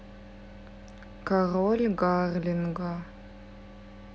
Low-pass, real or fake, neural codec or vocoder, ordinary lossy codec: none; real; none; none